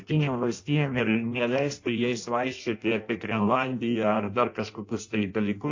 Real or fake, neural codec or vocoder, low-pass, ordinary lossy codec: fake; codec, 16 kHz in and 24 kHz out, 0.6 kbps, FireRedTTS-2 codec; 7.2 kHz; AAC, 48 kbps